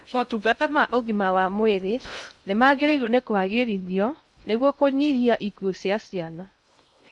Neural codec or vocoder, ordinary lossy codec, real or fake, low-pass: codec, 16 kHz in and 24 kHz out, 0.6 kbps, FocalCodec, streaming, 2048 codes; none; fake; 10.8 kHz